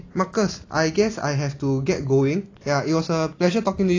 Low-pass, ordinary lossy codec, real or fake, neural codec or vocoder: 7.2 kHz; AAC, 32 kbps; real; none